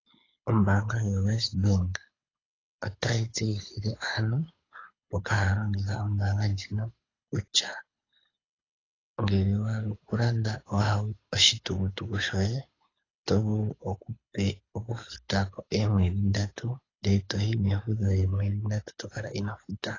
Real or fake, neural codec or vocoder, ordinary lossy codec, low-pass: fake; codec, 24 kHz, 6 kbps, HILCodec; AAC, 32 kbps; 7.2 kHz